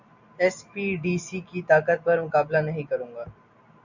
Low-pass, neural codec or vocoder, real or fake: 7.2 kHz; none; real